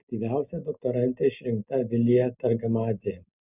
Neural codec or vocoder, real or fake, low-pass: none; real; 3.6 kHz